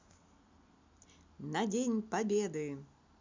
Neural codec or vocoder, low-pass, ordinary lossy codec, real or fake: none; 7.2 kHz; MP3, 64 kbps; real